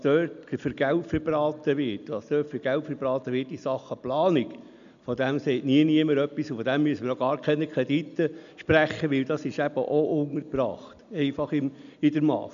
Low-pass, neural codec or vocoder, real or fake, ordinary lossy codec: 7.2 kHz; none; real; none